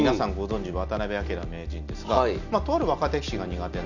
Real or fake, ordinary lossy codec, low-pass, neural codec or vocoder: real; none; 7.2 kHz; none